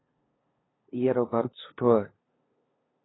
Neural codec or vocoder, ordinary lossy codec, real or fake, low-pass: codec, 16 kHz, 2 kbps, FunCodec, trained on LibriTTS, 25 frames a second; AAC, 16 kbps; fake; 7.2 kHz